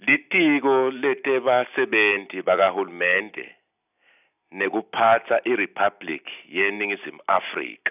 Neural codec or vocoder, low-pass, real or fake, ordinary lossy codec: none; 3.6 kHz; real; none